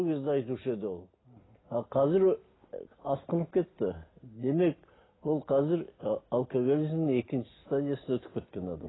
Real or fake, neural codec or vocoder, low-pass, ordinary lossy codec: real; none; 7.2 kHz; AAC, 16 kbps